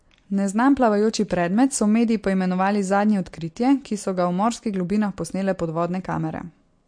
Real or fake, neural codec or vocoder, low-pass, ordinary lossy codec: real; none; 9.9 kHz; MP3, 48 kbps